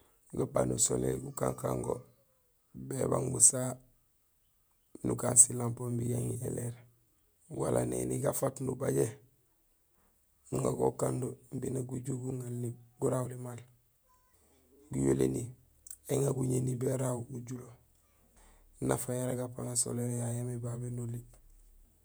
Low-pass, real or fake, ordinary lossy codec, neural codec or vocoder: none; fake; none; vocoder, 48 kHz, 128 mel bands, Vocos